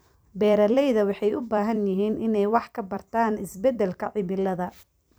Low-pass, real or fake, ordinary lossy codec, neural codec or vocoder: none; fake; none; vocoder, 44.1 kHz, 128 mel bands every 256 samples, BigVGAN v2